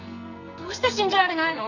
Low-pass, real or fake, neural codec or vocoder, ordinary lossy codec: 7.2 kHz; fake; codec, 44.1 kHz, 2.6 kbps, SNAC; none